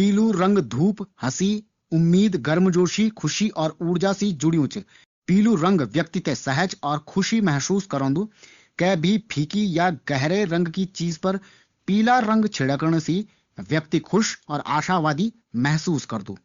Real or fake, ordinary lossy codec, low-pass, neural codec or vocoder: fake; Opus, 64 kbps; 7.2 kHz; codec, 16 kHz, 8 kbps, FunCodec, trained on Chinese and English, 25 frames a second